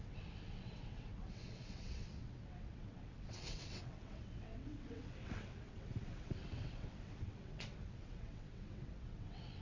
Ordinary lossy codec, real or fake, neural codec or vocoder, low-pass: MP3, 64 kbps; fake; vocoder, 22.05 kHz, 80 mel bands, WaveNeXt; 7.2 kHz